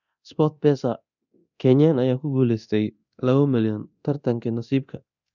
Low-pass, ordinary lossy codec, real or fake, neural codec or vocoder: 7.2 kHz; none; fake; codec, 24 kHz, 0.9 kbps, DualCodec